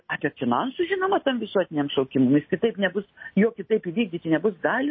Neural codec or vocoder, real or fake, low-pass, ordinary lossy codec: none; real; 7.2 kHz; MP3, 24 kbps